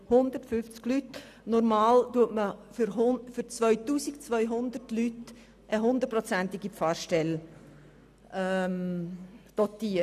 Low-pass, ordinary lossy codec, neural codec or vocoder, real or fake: 14.4 kHz; none; none; real